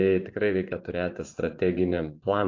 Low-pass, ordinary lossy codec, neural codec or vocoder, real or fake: 7.2 kHz; AAC, 48 kbps; vocoder, 24 kHz, 100 mel bands, Vocos; fake